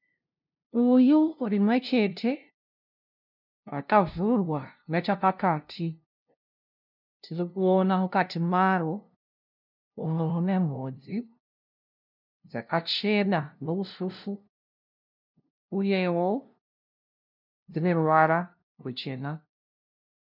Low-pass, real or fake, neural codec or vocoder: 5.4 kHz; fake; codec, 16 kHz, 0.5 kbps, FunCodec, trained on LibriTTS, 25 frames a second